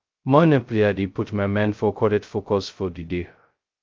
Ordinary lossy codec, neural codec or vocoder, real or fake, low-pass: Opus, 24 kbps; codec, 16 kHz, 0.2 kbps, FocalCodec; fake; 7.2 kHz